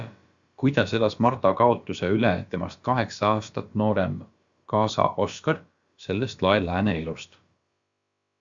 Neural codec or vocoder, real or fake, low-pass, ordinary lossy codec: codec, 16 kHz, about 1 kbps, DyCAST, with the encoder's durations; fake; 7.2 kHz; Opus, 64 kbps